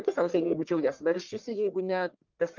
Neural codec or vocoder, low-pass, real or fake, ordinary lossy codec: codec, 44.1 kHz, 1.7 kbps, Pupu-Codec; 7.2 kHz; fake; Opus, 24 kbps